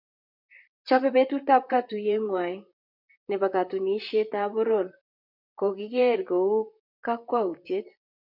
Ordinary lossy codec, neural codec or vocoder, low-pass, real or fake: MP3, 48 kbps; vocoder, 44.1 kHz, 128 mel bands, Pupu-Vocoder; 5.4 kHz; fake